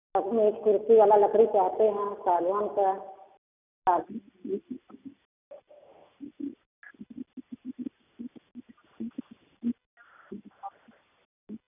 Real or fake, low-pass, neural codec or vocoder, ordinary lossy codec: real; 3.6 kHz; none; none